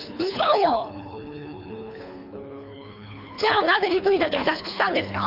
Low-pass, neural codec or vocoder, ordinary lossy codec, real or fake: 5.4 kHz; codec, 24 kHz, 3 kbps, HILCodec; none; fake